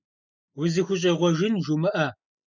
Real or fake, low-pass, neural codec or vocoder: real; 7.2 kHz; none